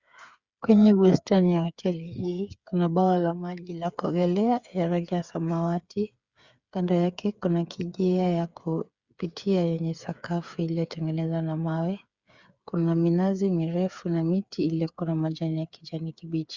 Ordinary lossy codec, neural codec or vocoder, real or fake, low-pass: Opus, 64 kbps; codec, 16 kHz, 8 kbps, FreqCodec, smaller model; fake; 7.2 kHz